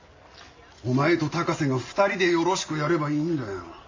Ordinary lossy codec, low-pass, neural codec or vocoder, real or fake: MP3, 48 kbps; 7.2 kHz; none; real